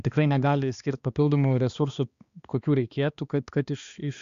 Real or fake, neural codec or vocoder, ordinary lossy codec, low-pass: fake; codec, 16 kHz, 2 kbps, X-Codec, HuBERT features, trained on balanced general audio; Opus, 64 kbps; 7.2 kHz